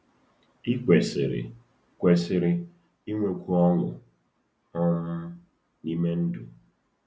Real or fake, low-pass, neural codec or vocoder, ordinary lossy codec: real; none; none; none